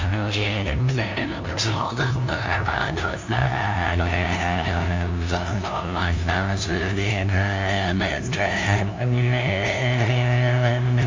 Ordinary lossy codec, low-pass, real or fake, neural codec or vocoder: MP3, 48 kbps; 7.2 kHz; fake; codec, 16 kHz, 0.5 kbps, FunCodec, trained on LibriTTS, 25 frames a second